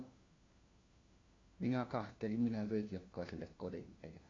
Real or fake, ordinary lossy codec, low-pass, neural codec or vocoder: fake; AAC, 32 kbps; 7.2 kHz; codec, 16 kHz, 1 kbps, FunCodec, trained on LibriTTS, 50 frames a second